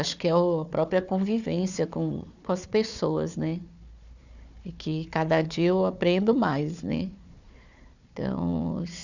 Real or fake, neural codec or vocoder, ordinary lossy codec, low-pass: fake; codec, 16 kHz, 4 kbps, FunCodec, trained on Chinese and English, 50 frames a second; none; 7.2 kHz